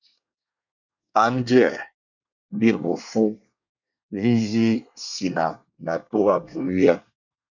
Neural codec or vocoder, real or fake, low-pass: codec, 24 kHz, 1 kbps, SNAC; fake; 7.2 kHz